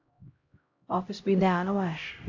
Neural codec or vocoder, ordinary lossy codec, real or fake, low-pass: codec, 16 kHz, 0.5 kbps, X-Codec, HuBERT features, trained on LibriSpeech; AAC, 48 kbps; fake; 7.2 kHz